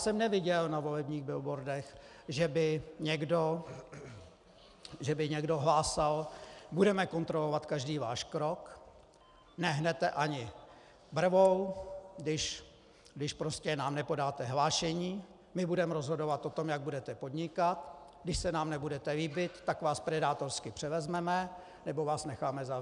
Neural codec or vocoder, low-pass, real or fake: none; 10.8 kHz; real